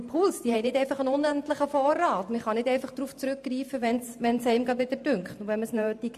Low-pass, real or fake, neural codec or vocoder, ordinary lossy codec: 14.4 kHz; fake; vocoder, 44.1 kHz, 128 mel bands every 512 samples, BigVGAN v2; MP3, 64 kbps